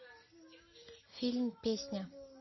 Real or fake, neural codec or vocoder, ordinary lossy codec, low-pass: real; none; MP3, 24 kbps; 7.2 kHz